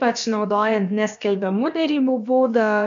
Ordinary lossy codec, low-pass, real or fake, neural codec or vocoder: MP3, 64 kbps; 7.2 kHz; fake; codec, 16 kHz, about 1 kbps, DyCAST, with the encoder's durations